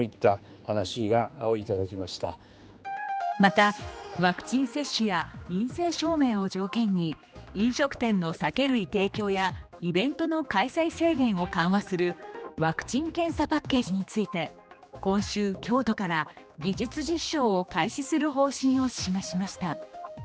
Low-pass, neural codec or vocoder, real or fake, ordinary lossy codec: none; codec, 16 kHz, 2 kbps, X-Codec, HuBERT features, trained on general audio; fake; none